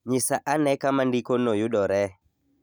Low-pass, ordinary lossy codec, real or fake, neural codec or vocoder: none; none; real; none